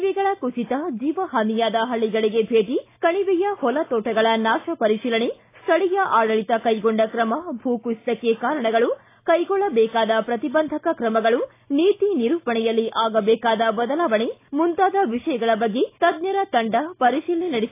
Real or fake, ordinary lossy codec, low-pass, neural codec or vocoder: real; AAC, 24 kbps; 3.6 kHz; none